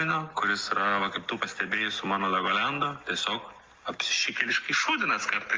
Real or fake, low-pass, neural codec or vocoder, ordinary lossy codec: real; 7.2 kHz; none; Opus, 24 kbps